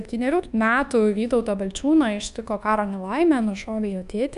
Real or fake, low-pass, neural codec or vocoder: fake; 10.8 kHz; codec, 24 kHz, 1.2 kbps, DualCodec